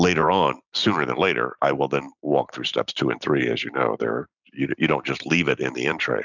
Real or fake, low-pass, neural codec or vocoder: fake; 7.2 kHz; vocoder, 44.1 kHz, 128 mel bands every 256 samples, BigVGAN v2